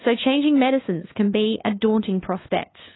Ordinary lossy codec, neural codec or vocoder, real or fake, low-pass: AAC, 16 kbps; codec, 16 kHz, 2 kbps, FunCodec, trained on LibriTTS, 25 frames a second; fake; 7.2 kHz